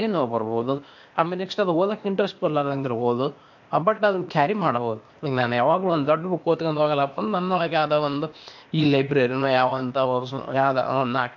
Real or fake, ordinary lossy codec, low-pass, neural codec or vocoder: fake; MP3, 48 kbps; 7.2 kHz; codec, 16 kHz, 0.8 kbps, ZipCodec